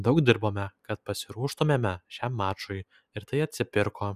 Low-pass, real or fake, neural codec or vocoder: 14.4 kHz; real; none